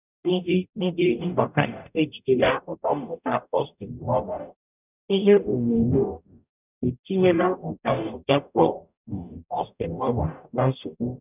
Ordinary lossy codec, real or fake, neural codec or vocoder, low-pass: none; fake; codec, 44.1 kHz, 0.9 kbps, DAC; 3.6 kHz